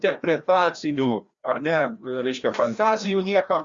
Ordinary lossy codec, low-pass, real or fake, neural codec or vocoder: Opus, 64 kbps; 7.2 kHz; fake; codec, 16 kHz, 1 kbps, FreqCodec, larger model